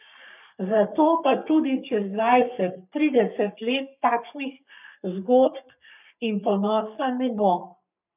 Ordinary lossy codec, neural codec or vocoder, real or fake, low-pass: none; codec, 44.1 kHz, 3.4 kbps, Pupu-Codec; fake; 3.6 kHz